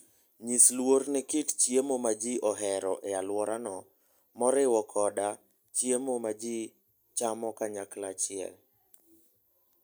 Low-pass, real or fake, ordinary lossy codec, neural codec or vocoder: none; real; none; none